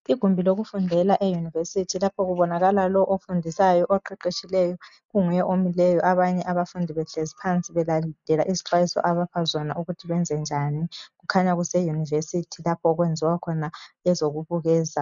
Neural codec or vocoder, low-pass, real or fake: none; 7.2 kHz; real